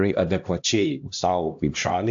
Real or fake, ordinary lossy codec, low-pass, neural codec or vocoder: fake; AAC, 64 kbps; 7.2 kHz; codec, 16 kHz, 1 kbps, X-Codec, HuBERT features, trained on LibriSpeech